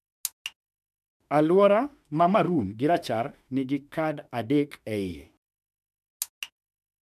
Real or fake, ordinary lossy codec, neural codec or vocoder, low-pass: fake; none; autoencoder, 48 kHz, 32 numbers a frame, DAC-VAE, trained on Japanese speech; 14.4 kHz